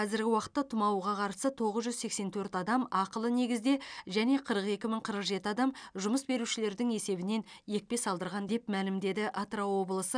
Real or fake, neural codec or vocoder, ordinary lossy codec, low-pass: real; none; none; 9.9 kHz